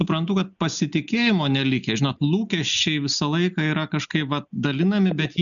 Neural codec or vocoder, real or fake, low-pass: none; real; 7.2 kHz